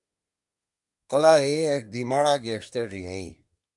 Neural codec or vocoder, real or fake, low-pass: codec, 24 kHz, 1 kbps, SNAC; fake; 10.8 kHz